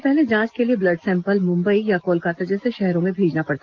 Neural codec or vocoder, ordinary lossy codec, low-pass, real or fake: none; Opus, 16 kbps; 7.2 kHz; real